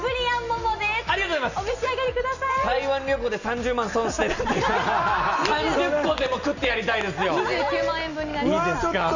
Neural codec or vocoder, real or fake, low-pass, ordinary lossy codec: none; real; 7.2 kHz; none